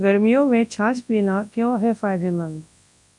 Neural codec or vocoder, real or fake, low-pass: codec, 24 kHz, 0.9 kbps, WavTokenizer, large speech release; fake; 10.8 kHz